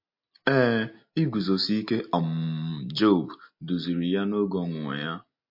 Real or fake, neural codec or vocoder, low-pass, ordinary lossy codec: real; none; 5.4 kHz; MP3, 32 kbps